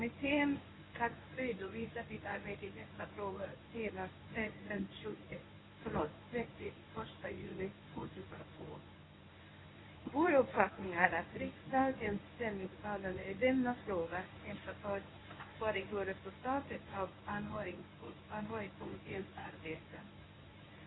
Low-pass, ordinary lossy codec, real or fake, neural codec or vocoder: 7.2 kHz; AAC, 16 kbps; fake; codec, 24 kHz, 0.9 kbps, WavTokenizer, medium speech release version 1